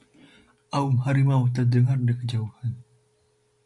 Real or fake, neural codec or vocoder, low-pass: real; none; 10.8 kHz